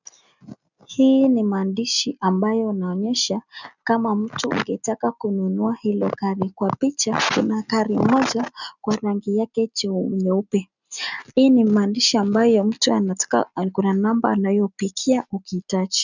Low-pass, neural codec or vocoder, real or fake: 7.2 kHz; none; real